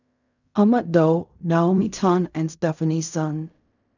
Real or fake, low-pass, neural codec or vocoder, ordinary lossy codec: fake; 7.2 kHz; codec, 16 kHz in and 24 kHz out, 0.4 kbps, LongCat-Audio-Codec, fine tuned four codebook decoder; none